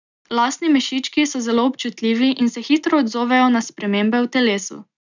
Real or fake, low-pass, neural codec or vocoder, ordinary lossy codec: real; 7.2 kHz; none; none